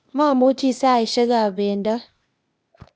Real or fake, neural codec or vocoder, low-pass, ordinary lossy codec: fake; codec, 16 kHz, 0.8 kbps, ZipCodec; none; none